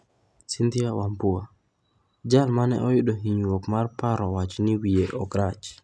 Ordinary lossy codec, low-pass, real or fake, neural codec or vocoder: none; none; real; none